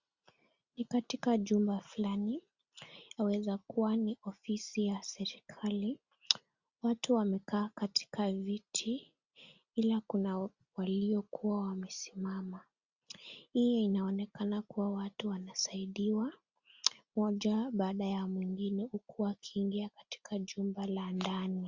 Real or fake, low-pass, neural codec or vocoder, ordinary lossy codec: real; 7.2 kHz; none; Opus, 64 kbps